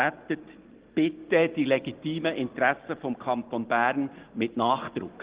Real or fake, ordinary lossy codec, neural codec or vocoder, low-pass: real; Opus, 16 kbps; none; 3.6 kHz